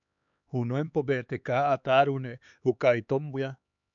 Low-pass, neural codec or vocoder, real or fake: 7.2 kHz; codec, 16 kHz, 4 kbps, X-Codec, HuBERT features, trained on LibriSpeech; fake